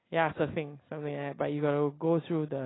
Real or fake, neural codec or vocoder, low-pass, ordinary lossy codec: real; none; 7.2 kHz; AAC, 16 kbps